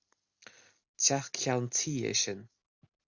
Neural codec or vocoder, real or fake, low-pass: none; real; 7.2 kHz